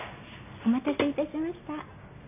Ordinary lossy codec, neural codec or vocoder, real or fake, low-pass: none; none; real; 3.6 kHz